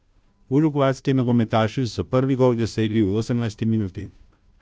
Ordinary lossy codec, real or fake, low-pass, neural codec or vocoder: none; fake; none; codec, 16 kHz, 0.5 kbps, FunCodec, trained on Chinese and English, 25 frames a second